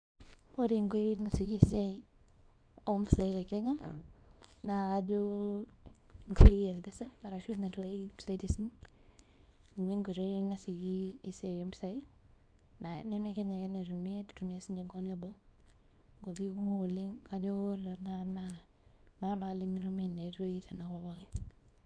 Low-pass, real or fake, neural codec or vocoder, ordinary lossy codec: 9.9 kHz; fake; codec, 24 kHz, 0.9 kbps, WavTokenizer, small release; none